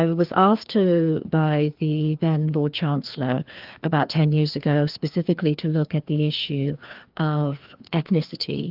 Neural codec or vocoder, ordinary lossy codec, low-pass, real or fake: codec, 16 kHz, 2 kbps, FreqCodec, larger model; Opus, 32 kbps; 5.4 kHz; fake